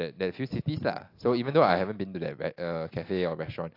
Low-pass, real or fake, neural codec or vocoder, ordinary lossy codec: 5.4 kHz; real; none; AAC, 32 kbps